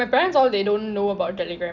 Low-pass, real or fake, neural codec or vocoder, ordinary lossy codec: 7.2 kHz; real; none; none